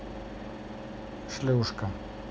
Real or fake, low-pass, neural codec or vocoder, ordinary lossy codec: real; none; none; none